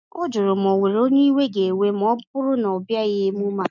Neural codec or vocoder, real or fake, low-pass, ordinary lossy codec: none; real; 7.2 kHz; none